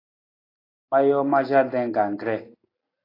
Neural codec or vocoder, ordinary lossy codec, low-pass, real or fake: none; AAC, 24 kbps; 5.4 kHz; real